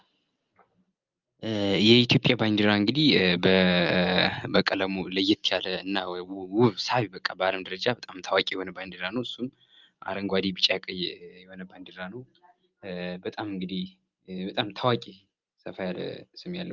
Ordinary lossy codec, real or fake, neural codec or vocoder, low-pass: Opus, 32 kbps; real; none; 7.2 kHz